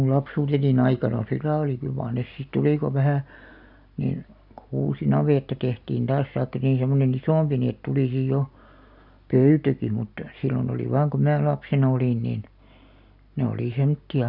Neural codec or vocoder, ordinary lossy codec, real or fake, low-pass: none; none; real; 5.4 kHz